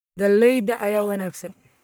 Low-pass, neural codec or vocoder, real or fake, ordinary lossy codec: none; codec, 44.1 kHz, 1.7 kbps, Pupu-Codec; fake; none